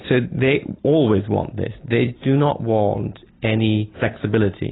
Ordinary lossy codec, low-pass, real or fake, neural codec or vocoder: AAC, 16 kbps; 7.2 kHz; real; none